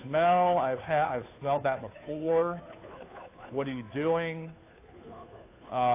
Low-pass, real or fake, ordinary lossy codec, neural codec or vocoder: 3.6 kHz; fake; AAC, 24 kbps; codec, 16 kHz, 2 kbps, FunCodec, trained on Chinese and English, 25 frames a second